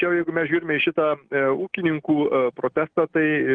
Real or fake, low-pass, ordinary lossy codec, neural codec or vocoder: real; 9.9 kHz; Opus, 24 kbps; none